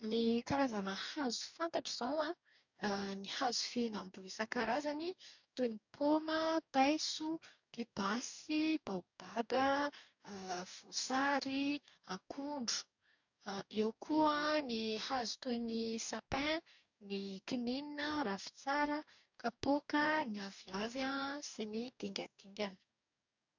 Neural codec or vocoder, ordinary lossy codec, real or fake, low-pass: codec, 44.1 kHz, 2.6 kbps, DAC; none; fake; 7.2 kHz